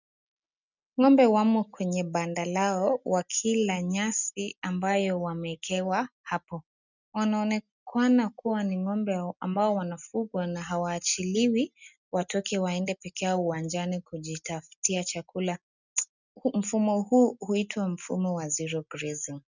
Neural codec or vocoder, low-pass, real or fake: none; 7.2 kHz; real